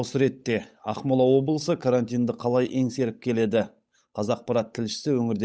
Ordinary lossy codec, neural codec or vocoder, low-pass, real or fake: none; codec, 16 kHz, 8 kbps, FunCodec, trained on Chinese and English, 25 frames a second; none; fake